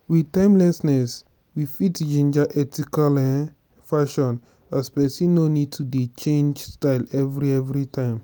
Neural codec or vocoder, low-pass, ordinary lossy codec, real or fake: none; none; none; real